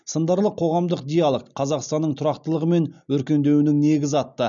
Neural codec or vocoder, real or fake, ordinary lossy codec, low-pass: none; real; none; 7.2 kHz